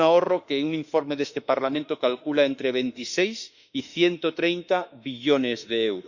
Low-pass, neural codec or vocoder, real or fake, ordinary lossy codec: 7.2 kHz; autoencoder, 48 kHz, 32 numbers a frame, DAC-VAE, trained on Japanese speech; fake; Opus, 64 kbps